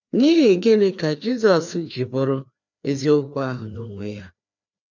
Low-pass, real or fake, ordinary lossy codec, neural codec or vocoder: 7.2 kHz; fake; none; codec, 16 kHz, 2 kbps, FreqCodec, larger model